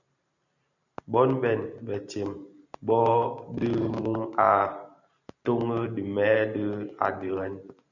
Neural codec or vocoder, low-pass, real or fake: vocoder, 44.1 kHz, 128 mel bands every 512 samples, BigVGAN v2; 7.2 kHz; fake